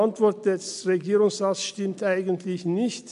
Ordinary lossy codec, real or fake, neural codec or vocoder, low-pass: none; real; none; 10.8 kHz